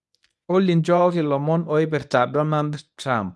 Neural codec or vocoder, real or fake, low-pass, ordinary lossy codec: codec, 24 kHz, 0.9 kbps, WavTokenizer, medium speech release version 1; fake; none; none